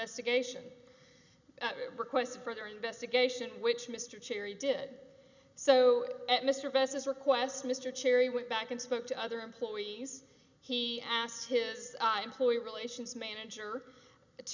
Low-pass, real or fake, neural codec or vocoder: 7.2 kHz; real; none